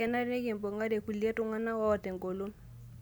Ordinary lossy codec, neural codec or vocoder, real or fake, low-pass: none; none; real; none